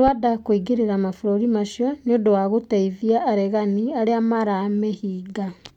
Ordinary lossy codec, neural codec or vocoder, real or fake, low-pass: MP3, 96 kbps; none; real; 19.8 kHz